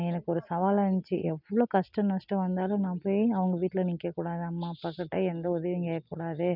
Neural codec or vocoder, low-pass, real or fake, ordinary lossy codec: none; 5.4 kHz; real; none